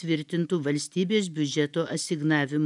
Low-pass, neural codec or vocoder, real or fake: 10.8 kHz; none; real